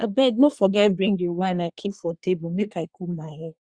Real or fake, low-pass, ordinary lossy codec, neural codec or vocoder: fake; 9.9 kHz; Opus, 64 kbps; codec, 24 kHz, 1 kbps, SNAC